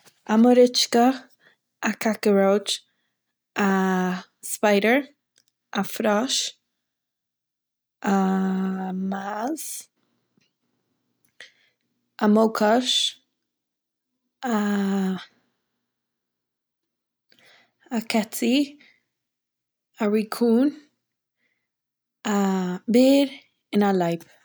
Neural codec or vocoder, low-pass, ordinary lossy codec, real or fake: none; none; none; real